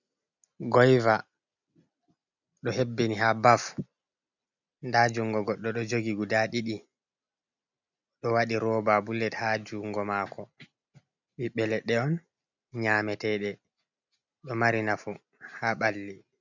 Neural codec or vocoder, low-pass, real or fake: none; 7.2 kHz; real